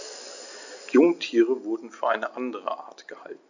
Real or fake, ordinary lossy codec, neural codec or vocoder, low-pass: real; none; none; 7.2 kHz